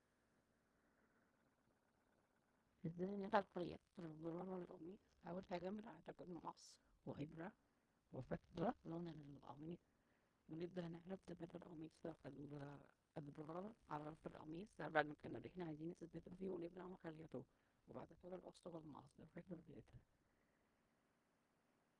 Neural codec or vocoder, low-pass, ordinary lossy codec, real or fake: codec, 16 kHz in and 24 kHz out, 0.4 kbps, LongCat-Audio-Codec, fine tuned four codebook decoder; 10.8 kHz; Opus, 32 kbps; fake